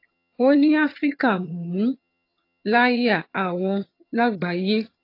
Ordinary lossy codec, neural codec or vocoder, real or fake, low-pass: AAC, 32 kbps; vocoder, 22.05 kHz, 80 mel bands, HiFi-GAN; fake; 5.4 kHz